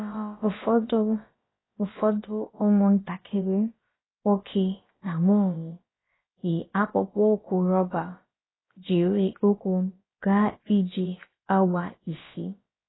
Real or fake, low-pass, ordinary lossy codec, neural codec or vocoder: fake; 7.2 kHz; AAC, 16 kbps; codec, 16 kHz, about 1 kbps, DyCAST, with the encoder's durations